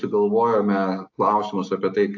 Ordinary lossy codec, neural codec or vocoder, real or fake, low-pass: AAC, 48 kbps; none; real; 7.2 kHz